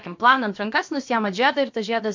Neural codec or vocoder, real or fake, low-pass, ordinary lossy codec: codec, 16 kHz, about 1 kbps, DyCAST, with the encoder's durations; fake; 7.2 kHz; MP3, 48 kbps